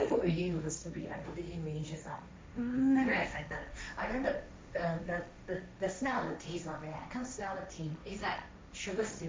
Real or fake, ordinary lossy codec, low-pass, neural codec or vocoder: fake; none; none; codec, 16 kHz, 1.1 kbps, Voila-Tokenizer